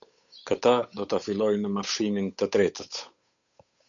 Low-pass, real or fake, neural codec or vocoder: 7.2 kHz; fake; codec, 16 kHz, 8 kbps, FunCodec, trained on Chinese and English, 25 frames a second